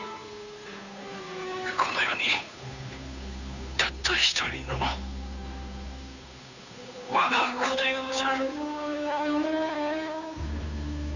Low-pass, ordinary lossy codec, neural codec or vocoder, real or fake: 7.2 kHz; none; codec, 16 kHz, 2 kbps, FunCodec, trained on Chinese and English, 25 frames a second; fake